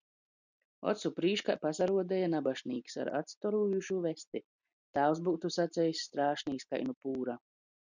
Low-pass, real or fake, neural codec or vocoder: 7.2 kHz; real; none